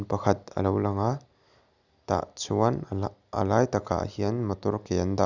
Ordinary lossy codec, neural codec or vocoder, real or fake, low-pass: none; none; real; 7.2 kHz